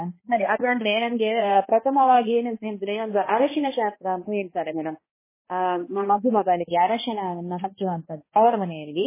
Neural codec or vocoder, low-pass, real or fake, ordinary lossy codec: codec, 16 kHz, 1 kbps, X-Codec, HuBERT features, trained on balanced general audio; 3.6 kHz; fake; MP3, 16 kbps